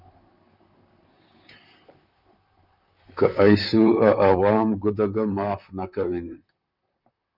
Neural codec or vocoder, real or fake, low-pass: vocoder, 44.1 kHz, 128 mel bands, Pupu-Vocoder; fake; 5.4 kHz